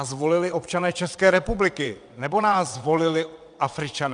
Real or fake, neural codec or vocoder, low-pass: fake; vocoder, 22.05 kHz, 80 mel bands, WaveNeXt; 9.9 kHz